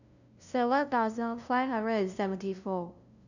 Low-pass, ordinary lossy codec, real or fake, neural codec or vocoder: 7.2 kHz; none; fake; codec, 16 kHz, 0.5 kbps, FunCodec, trained on LibriTTS, 25 frames a second